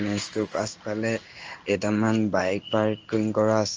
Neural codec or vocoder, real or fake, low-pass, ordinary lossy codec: codec, 16 kHz in and 24 kHz out, 1 kbps, XY-Tokenizer; fake; 7.2 kHz; Opus, 24 kbps